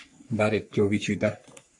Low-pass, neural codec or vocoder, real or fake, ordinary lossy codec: 10.8 kHz; codec, 44.1 kHz, 3.4 kbps, Pupu-Codec; fake; MP3, 64 kbps